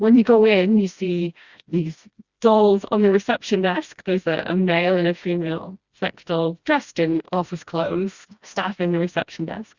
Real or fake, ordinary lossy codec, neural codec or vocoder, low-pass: fake; Opus, 64 kbps; codec, 16 kHz, 1 kbps, FreqCodec, smaller model; 7.2 kHz